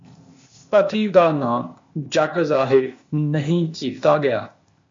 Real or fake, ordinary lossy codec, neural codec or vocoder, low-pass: fake; MP3, 48 kbps; codec, 16 kHz, 0.8 kbps, ZipCodec; 7.2 kHz